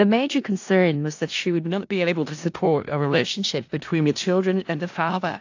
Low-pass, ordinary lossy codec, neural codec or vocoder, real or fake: 7.2 kHz; AAC, 48 kbps; codec, 16 kHz in and 24 kHz out, 0.4 kbps, LongCat-Audio-Codec, four codebook decoder; fake